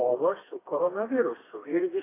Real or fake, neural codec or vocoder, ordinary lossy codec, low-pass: fake; codec, 16 kHz, 2 kbps, FreqCodec, smaller model; AAC, 16 kbps; 3.6 kHz